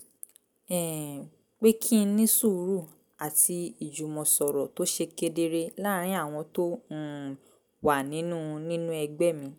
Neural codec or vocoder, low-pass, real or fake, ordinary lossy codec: none; none; real; none